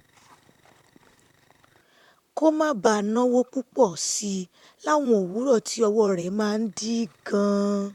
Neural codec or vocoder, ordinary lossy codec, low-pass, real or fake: vocoder, 44.1 kHz, 128 mel bands, Pupu-Vocoder; none; 19.8 kHz; fake